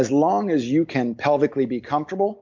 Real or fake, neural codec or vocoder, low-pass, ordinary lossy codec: real; none; 7.2 kHz; MP3, 64 kbps